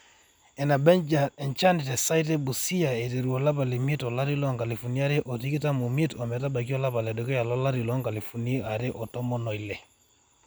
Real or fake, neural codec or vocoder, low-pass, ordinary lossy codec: real; none; none; none